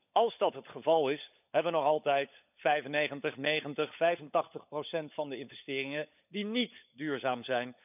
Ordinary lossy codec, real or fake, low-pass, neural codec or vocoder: none; fake; 3.6 kHz; codec, 16 kHz, 16 kbps, FunCodec, trained on LibriTTS, 50 frames a second